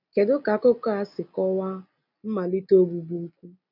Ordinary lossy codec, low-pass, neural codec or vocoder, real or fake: none; 5.4 kHz; none; real